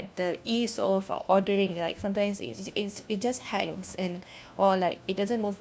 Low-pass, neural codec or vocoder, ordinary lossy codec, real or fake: none; codec, 16 kHz, 1 kbps, FunCodec, trained on LibriTTS, 50 frames a second; none; fake